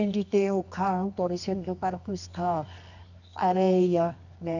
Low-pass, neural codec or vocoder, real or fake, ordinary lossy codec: 7.2 kHz; codec, 24 kHz, 0.9 kbps, WavTokenizer, medium music audio release; fake; none